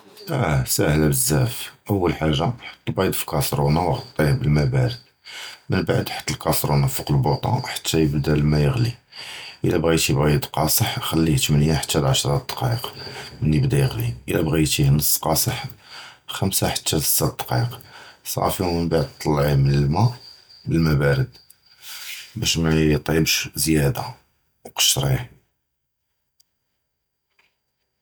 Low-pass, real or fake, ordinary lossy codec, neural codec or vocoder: none; real; none; none